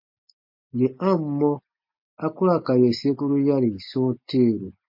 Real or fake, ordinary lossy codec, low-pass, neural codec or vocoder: real; MP3, 32 kbps; 5.4 kHz; none